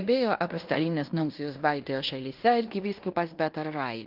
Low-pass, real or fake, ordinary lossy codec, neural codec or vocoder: 5.4 kHz; fake; Opus, 32 kbps; codec, 16 kHz in and 24 kHz out, 0.9 kbps, LongCat-Audio-Codec, fine tuned four codebook decoder